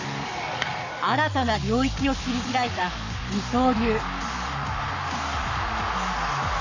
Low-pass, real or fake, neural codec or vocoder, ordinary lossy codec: 7.2 kHz; fake; codec, 44.1 kHz, 7.8 kbps, Pupu-Codec; none